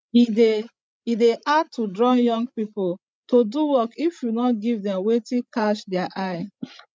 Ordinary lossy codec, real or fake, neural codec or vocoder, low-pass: none; fake; codec, 16 kHz, 16 kbps, FreqCodec, larger model; none